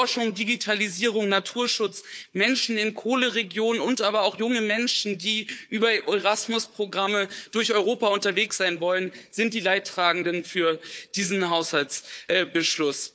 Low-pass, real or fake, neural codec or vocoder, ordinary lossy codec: none; fake; codec, 16 kHz, 4 kbps, FunCodec, trained on Chinese and English, 50 frames a second; none